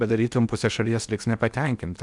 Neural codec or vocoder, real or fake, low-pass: codec, 16 kHz in and 24 kHz out, 0.8 kbps, FocalCodec, streaming, 65536 codes; fake; 10.8 kHz